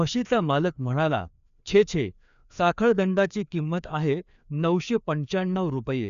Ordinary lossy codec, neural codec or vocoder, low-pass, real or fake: none; codec, 16 kHz, 2 kbps, FreqCodec, larger model; 7.2 kHz; fake